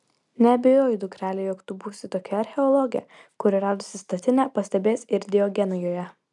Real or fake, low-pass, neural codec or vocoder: real; 10.8 kHz; none